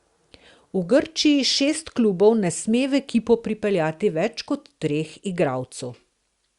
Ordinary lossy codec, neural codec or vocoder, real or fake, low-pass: Opus, 64 kbps; none; real; 10.8 kHz